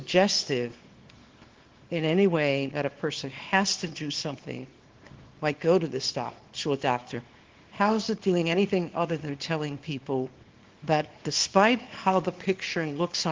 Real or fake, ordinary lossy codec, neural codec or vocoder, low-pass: fake; Opus, 16 kbps; codec, 24 kHz, 0.9 kbps, WavTokenizer, small release; 7.2 kHz